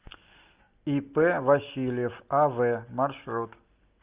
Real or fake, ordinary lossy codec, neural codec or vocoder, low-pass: real; Opus, 24 kbps; none; 3.6 kHz